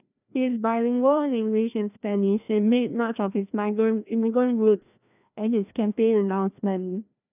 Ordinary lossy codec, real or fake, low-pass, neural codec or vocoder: none; fake; 3.6 kHz; codec, 16 kHz, 1 kbps, FreqCodec, larger model